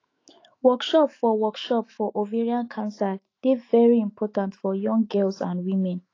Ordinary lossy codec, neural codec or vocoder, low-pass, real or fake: AAC, 32 kbps; autoencoder, 48 kHz, 128 numbers a frame, DAC-VAE, trained on Japanese speech; 7.2 kHz; fake